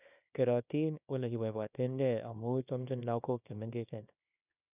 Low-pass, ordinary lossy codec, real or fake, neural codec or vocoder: 3.6 kHz; none; fake; codec, 24 kHz, 0.9 kbps, WavTokenizer, small release